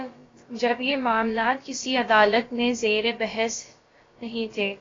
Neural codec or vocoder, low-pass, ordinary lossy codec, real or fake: codec, 16 kHz, about 1 kbps, DyCAST, with the encoder's durations; 7.2 kHz; AAC, 32 kbps; fake